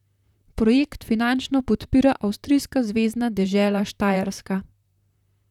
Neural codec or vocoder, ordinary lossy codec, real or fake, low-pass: vocoder, 44.1 kHz, 128 mel bands, Pupu-Vocoder; none; fake; 19.8 kHz